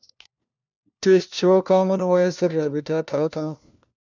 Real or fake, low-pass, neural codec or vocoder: fake; 7.2 kHz; codec, 16 kHz, 1 kbps, FunCodec, trained on LibriTTS, 50 frames a second